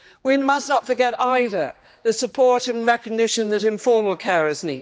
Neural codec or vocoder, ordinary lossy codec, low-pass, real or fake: codec, 16 kHz, 2 kbps, X-Codec, HuBERT features, trained on general audio; none; none; fake